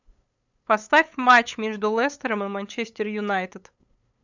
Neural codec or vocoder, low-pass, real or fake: codec, 16 kHz, 8 kbps, FunCodec, trained on LibriTTS, 25 frames a second; 7.2 kHz; fake